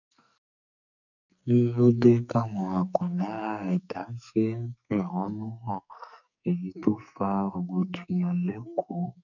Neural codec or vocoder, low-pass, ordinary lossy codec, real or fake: codec, 44.1 kHz, 2.6 kbps, SNAC; 7.2 kHz; none; fake